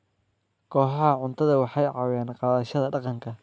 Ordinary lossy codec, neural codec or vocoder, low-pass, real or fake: none; none; none; real